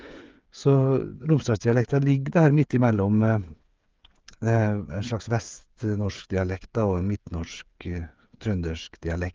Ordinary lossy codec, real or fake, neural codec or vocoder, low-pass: Opus, 24 kbps; fake; codec, 16 kHz, 8 kbps, FreqCodec, smaller model; 7.2 kHz